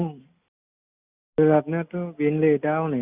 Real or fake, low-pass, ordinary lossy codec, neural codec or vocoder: real; 3.6 kHz; Opus, 64 kbps; none